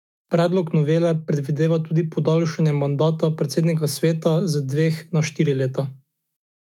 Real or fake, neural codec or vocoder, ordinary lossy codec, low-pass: fake; autoencoder, 48 kHz, 128 numbers a frame, DAC-VAE, trained on Japanese speech; none; 19.8 kHz